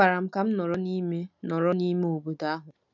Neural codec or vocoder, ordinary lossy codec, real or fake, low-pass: none; none; real; 7.2 kHz